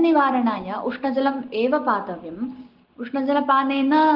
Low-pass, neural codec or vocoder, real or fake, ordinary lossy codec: 5.4 kHz; none; real; Opus, 16 kbps